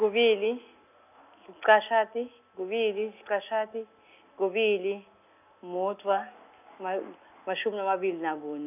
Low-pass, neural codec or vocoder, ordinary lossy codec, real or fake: 3.6 kHz; none; none; real